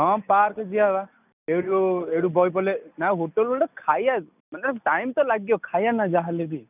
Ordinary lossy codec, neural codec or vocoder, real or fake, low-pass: none; none; real; 3.6 kHz